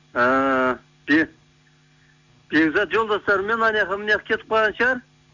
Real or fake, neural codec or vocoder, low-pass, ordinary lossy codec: real; none; 7.2 kHz; none